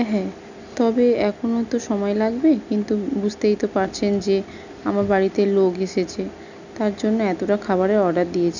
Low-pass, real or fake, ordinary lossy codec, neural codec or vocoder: 7.2 kHz; real; none; none